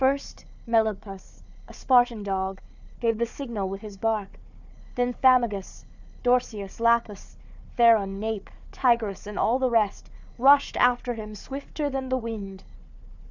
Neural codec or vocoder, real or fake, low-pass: codec, 16 kHz, 4 kbps, FunCodec, trained on Chinese and English, 50 frames a second; fake; 7.2 kHz